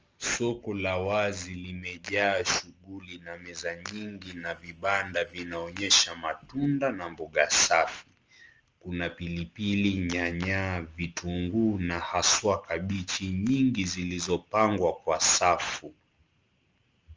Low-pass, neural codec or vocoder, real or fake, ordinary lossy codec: 7.2 kHz; none; real; Opus, 24 kbps